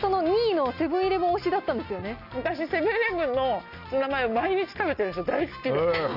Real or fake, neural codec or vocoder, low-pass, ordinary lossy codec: real; none; 5.4 kHz; none